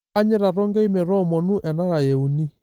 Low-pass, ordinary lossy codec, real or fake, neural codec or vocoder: 19.8 kHz; Opus, 24 kbps; real; none